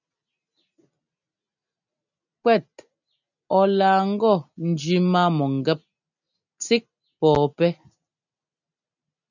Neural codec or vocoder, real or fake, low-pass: none; real; 7.2 kHz